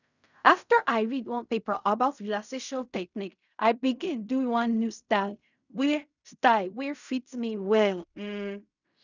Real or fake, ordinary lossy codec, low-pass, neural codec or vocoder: fake; none; 7.2 kHz; codec, 16 kHz in and 24 kHz out, 0.4 kbps, LongCat-Audio-Codec, fine tuned four codebook decoder